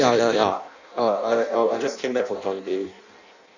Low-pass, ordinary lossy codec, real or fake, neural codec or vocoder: 7.2 kHz; none; fake; codec, 16 kHz in and 24 kHz out, 0.6 kbps, FireRedTTS-2 codec